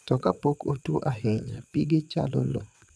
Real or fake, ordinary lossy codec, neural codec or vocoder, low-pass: fake; none; vocoder, 22.05 kHz, 80 mel bands, WaveNeXt; none